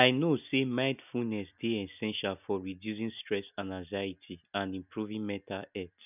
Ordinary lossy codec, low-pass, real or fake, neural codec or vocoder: none; 3.6 kHz; real; none